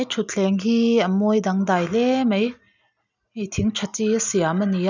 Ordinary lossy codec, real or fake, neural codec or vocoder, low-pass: none; real; none; 7.2 kHz